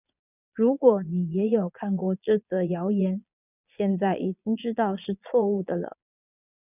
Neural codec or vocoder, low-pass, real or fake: vocoder, 22.05 kHz, 80 mel bands, Vocos; 3.6 kHz; fake